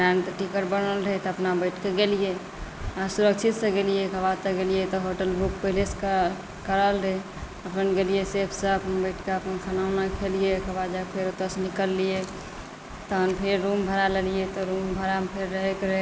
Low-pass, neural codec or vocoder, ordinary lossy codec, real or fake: none; none; none; real